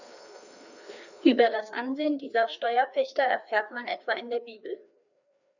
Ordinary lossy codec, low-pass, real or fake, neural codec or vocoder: none; 7.2 kHz; fake; codec, 16 kHz, 2 kbps, FreqCodec, larger model